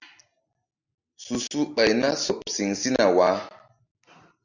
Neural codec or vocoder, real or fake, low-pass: none; real; 7.2 kHz